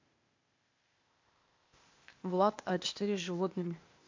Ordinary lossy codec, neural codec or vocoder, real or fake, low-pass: MP3, 48 kbps; codec, 16 kHz, 0.8 kbps, ZipCodec; fake; 7.2 kHz